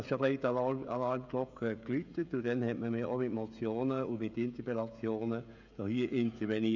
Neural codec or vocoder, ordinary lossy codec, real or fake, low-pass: codec, 16 kHz, 16 kbps, FreqCodec, smaller model; none; fake; 7.2 kHz